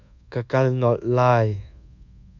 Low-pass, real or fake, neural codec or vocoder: 7.2 kHz; fake; codec, 24 kHz, 1.2 kbps, DualCodec